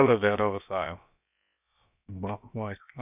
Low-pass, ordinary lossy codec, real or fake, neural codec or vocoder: 3.6 kHz; none; fake; codec, 16 kHz, about 1 kbps, DyCAST, with the encoder's durations